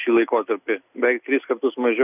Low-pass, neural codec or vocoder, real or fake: 3.6 kHz; none; real